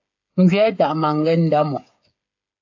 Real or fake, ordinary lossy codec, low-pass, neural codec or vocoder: fake; AAC, 48 kbps; 7.2 kHz; codec, 16 kHz, 8 kbps, FreqCodec, smaller model